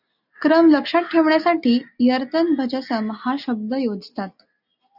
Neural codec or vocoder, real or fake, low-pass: none; real; 5.4 kHz